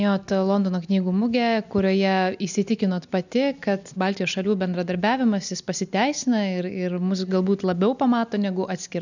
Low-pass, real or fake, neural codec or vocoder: 7.2 kHz; real; none